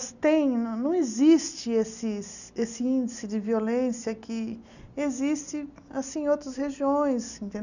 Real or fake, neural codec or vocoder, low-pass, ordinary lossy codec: real; none; 7.2 kHz; none